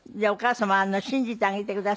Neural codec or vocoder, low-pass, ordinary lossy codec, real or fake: none; none; none; real